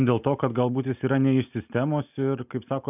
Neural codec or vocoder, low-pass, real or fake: none; 3.6 kHz; real